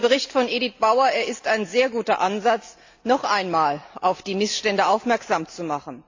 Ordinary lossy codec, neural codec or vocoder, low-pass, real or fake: AAC, 48 kbps; none; 7.2 kHz; real